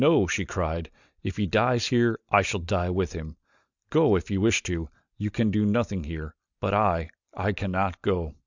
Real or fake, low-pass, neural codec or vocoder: real; 7.2 kHz; none